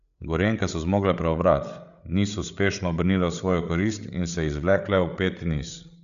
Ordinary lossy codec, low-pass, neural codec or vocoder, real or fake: none; 7.2 kHz; codec, 16 kHz, 8 kbps, FreqCodec, larger model; fake